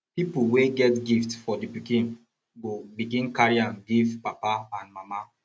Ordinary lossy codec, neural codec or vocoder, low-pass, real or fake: none; none; none; real